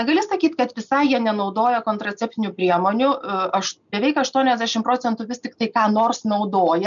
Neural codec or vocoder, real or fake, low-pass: none; real; 7.2 kHz